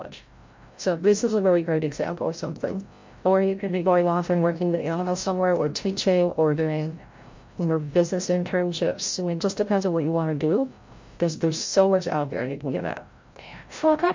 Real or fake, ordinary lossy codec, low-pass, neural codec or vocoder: fake; MP3, 48 kbps; 7.2 kHz; codec, 16 kHz, 0.5 kbps, FreqCodec, larger model